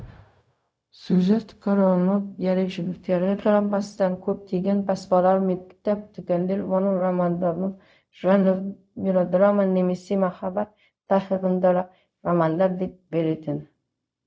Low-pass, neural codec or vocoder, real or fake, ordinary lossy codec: none; codec, 16 kHz, 0.4 kbps, LongCat-Audio-Codec; fake; none